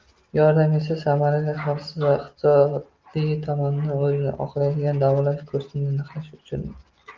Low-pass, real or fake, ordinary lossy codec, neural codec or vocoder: 7.2 kHz; real; Opus, 24 kbps; none